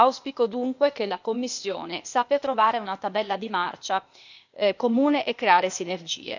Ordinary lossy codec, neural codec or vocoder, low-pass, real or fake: none; codec, 16 kHz, 0.8 kbps, ZipCodec; 7.2 kHz; fake